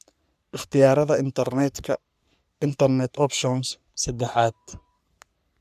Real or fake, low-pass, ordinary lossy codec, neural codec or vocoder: fake; 14.4 kHz; none; codec, 44.1 kHz, 3.4 kbps, Pupu-Codec